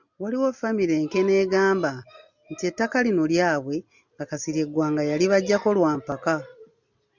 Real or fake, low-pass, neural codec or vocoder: real; 7.2 kHz; none